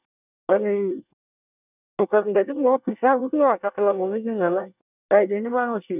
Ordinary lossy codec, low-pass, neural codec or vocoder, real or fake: none; 3.6 kHz; codec, 24 kHz, 1 kbps, SNAC; fake